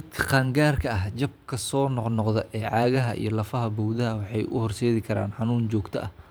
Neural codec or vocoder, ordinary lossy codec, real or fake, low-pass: vocoder, 44.1 kHz, 128 mel bands every 512 samples, BigVGAN v2; none; fake; none